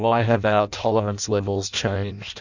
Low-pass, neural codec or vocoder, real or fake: 7.2 kHz; codec, 16 kHz in and 24 kHz out, 0.6 kbps, FireRedTTS-2 codec; fake